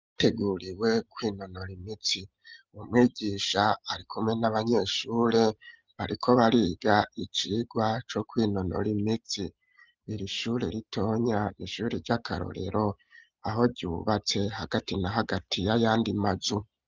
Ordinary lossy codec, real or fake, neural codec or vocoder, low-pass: Opus, 24 kbps; real; none; 7.2 kHz